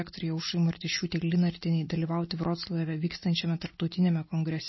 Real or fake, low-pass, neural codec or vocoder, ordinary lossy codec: real; 7.2 kHz; none; MP3, 24 kbps